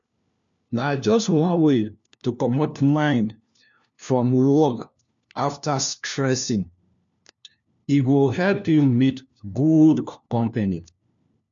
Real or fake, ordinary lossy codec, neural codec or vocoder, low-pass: fake; MP3, 64 kbps; codec, 16 kHz, 1 kbps, FunCodec, trained on LibriTTS, 50 frames a second; 7.2 kHz